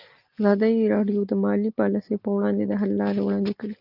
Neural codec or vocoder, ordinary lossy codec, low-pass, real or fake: none; Opus, 32 kbps; 5.4 kHz; real